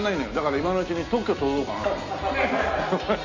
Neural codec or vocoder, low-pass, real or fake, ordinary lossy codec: none; 7.2 kHz; real; AAC, 48 kbps